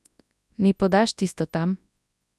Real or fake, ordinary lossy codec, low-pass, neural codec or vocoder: fake; none; none; codec, 24 kHz, 0.9 kbps, WavTokenizer, large speech release